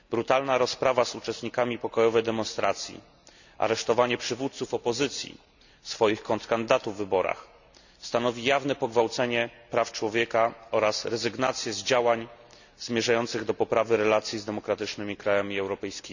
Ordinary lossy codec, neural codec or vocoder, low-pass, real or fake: none; none; 7.2 kHz; real